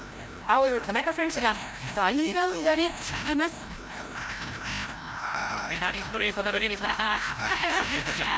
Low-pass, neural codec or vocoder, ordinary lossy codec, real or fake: none; codec, 16 kHz, 0.5 kbps, FreqCodec, larger model; none; fake